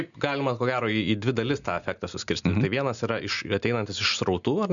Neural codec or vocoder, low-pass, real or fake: none; 7.2 kHz; real